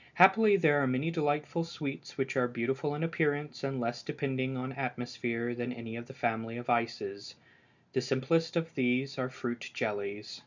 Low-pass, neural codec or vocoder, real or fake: 7.2 kHz; none; real